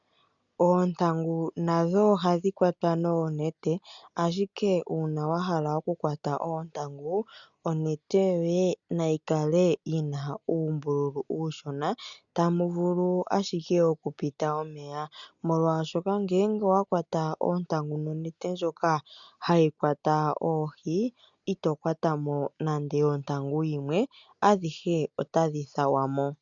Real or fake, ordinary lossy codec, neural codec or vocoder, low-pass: real; MP3, 96 kbps; none; 7.2 kHz